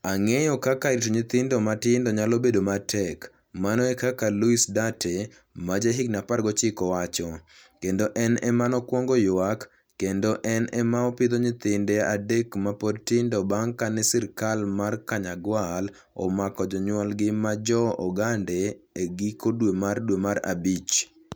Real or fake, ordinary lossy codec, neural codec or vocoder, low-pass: real; none; none; none